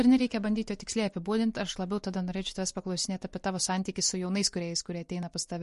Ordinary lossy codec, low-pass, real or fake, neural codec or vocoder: MP3, 48 kbps; 14.4 kHz; real; none